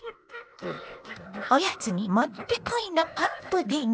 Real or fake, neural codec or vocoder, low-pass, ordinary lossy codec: fake; codec, 16 kHz, 0.8 kbps, ZipCodec; none; none